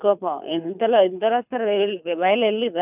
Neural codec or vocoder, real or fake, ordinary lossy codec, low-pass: codec, 24 kHz, 6 kbps, HILCodec; fake; none; 3.6 kHz